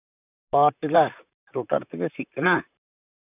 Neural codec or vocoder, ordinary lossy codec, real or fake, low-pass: vocoder, 44.1 kHz, 128 mel bands, Pupu-Vocoder; none; fake; 3.6 kHz